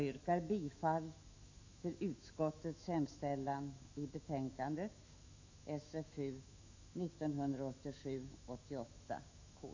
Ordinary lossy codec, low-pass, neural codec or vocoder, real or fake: AAC, 48 kbps; 7.2 kHz; autoencoder, 48 kHz, 128 numbers a frame, DAC-VAE, trained on Japanese speech; fake